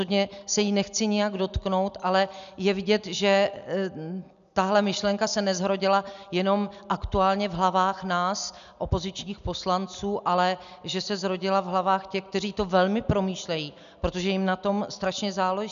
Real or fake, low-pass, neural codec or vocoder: real; 7.2 kHz; none